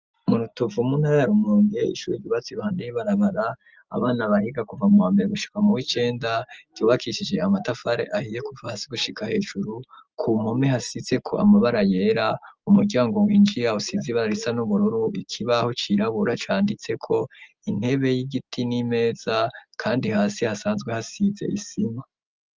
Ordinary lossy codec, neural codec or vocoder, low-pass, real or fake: Opus, 24 kbps; none; 7.2 kHz; real